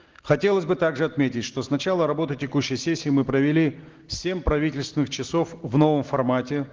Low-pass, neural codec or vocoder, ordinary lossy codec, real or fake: 7.2 kHz; none; Opus, 16 kbps; real